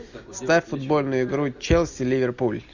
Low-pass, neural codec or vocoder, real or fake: 7.2 kHz; none; real